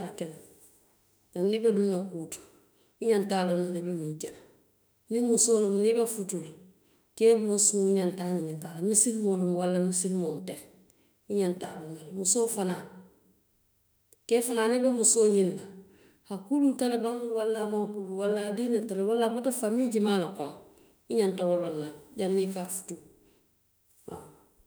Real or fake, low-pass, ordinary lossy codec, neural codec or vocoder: fake; none; none; autoencoder, 48 kHz, 32 numbers a frame, DAC-VAE, trained on Japanese speech